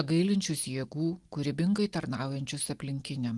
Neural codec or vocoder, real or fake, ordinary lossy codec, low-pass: none; real; Opus, 24 kbps; 10.8 kHz